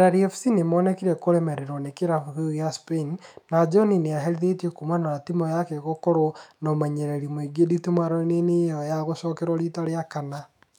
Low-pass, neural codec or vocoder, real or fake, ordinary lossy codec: 19.8 kHz; autoencoder, 48 kHz, 128 numbers a frame, DAC-VAE, trained on Japanese speech; fake; none